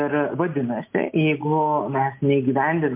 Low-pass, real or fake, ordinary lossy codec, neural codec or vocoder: 3.6 kHz; real; MP3, 32 kbps; none